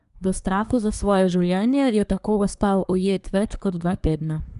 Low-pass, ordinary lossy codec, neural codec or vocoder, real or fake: 10.8 kHz; none; codec, 24 kHz, 1 kbps, SNAC; fake